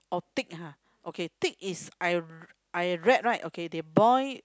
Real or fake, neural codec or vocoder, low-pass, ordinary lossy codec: real; none; none; none